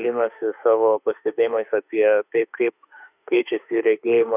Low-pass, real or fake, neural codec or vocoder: 3.6 kHz; fake; autoencoder, 48 kHz, 32 numbers a frame, DAC-VAE, trained on Japanese speech